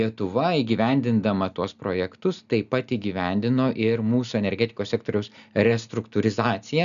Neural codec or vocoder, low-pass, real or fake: none; 7.2 kHz; real